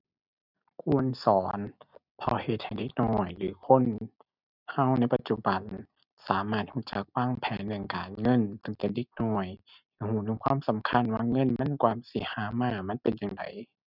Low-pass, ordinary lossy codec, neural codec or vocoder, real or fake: 5.4 kHz; none; none; real